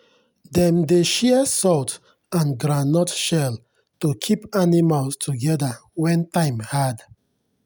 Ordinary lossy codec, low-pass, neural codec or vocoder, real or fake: none; none; none; real